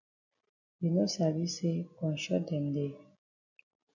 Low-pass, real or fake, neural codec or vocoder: 7.2 kHz; real; none